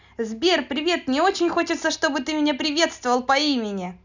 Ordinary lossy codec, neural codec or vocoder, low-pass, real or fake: none; none; 7.2 kHz; real